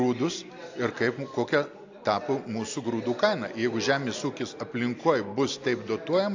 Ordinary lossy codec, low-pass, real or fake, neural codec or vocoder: AAC, 48 kbps; 7.2 kHz; real; none